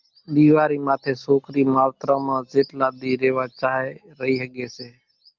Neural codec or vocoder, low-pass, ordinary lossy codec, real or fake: none; 7.2 kHz; Opus, 32 kbps; real